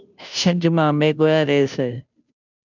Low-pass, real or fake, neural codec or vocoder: 7.2 kHz; fake; codec, 16 kHz, 0.5 kbps, FunCodec, trained on Chinese and English, 25 frames a second